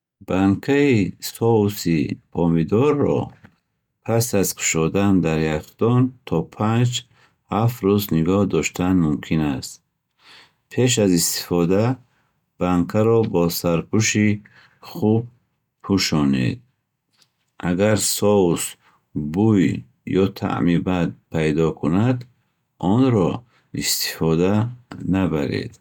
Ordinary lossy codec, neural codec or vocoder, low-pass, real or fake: none; none; 19.8 kHz; real